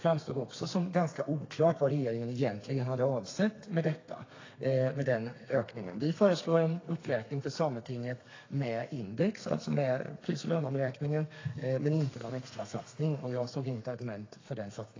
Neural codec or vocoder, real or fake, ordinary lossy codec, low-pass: codec, 32 kHz, 1.9 kbps, SNAC; fake; AAC, 32 kbps; 7.2 kHz